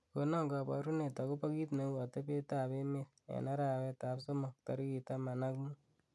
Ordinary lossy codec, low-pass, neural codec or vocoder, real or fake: none; none; none; real